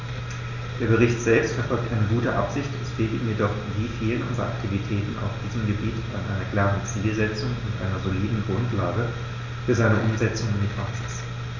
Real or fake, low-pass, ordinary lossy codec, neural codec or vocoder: real; 7.2 kHz; none; none